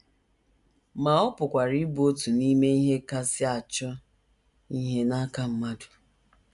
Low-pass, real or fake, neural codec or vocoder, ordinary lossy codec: 10.8 kHz; real; none; none